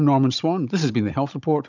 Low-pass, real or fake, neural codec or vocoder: 7.2 kHz; fake; codec, 16 kHz, 16 kbps, FunCodec, trained on Chinese and English, 50 frames a second